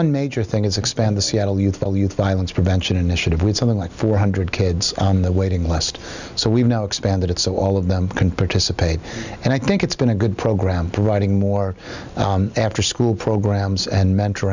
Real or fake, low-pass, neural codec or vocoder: real; 7.2 kHz; none